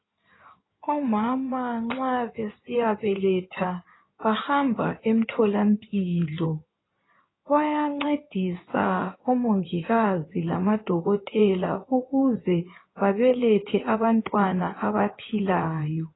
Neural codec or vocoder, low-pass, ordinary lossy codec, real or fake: codec, 16 kHz in and 24 kHz out, 2.2 kbps, FireRedTTS-2 codec; 7.2 kHz; AAC, 16 kbps; fake